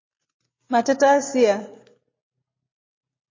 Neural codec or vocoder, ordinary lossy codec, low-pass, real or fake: none; MP3, 32 kbps; 7.2 kHz; real